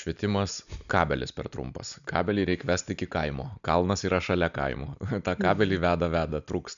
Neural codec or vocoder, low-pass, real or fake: none; 7.2 kHz; real